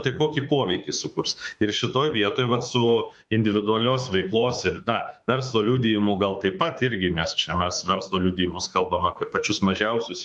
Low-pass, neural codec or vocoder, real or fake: 10.8 kHz; autoencoder, 48 kHz, 32 numbers a frame, DAC-VAE, trained on Japanese speech; fake